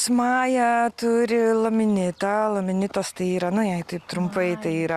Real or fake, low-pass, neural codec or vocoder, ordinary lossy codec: real; 14.4 kHz; none; Opus, 64 kbps